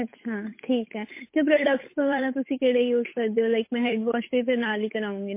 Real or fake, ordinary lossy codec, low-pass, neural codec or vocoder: fake; MP3, 24 kbps; 3.6 kHz; codec, 16 kHz, 8 kbps, FunCodec, trained on Chinese and English, 25 frames a second